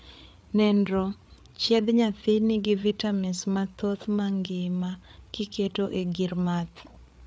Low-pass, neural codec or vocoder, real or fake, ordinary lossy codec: none; codec, 16 kHz, 8 kbps, FreqCodec, larger model; fake; none